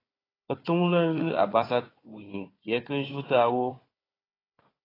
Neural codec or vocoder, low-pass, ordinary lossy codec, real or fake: codec, 16 kHz, 4 kbps, FunCodec, trained on Chinese and English, 50 frames a second; 5.4 kHz; AAC, 24 kbps; fake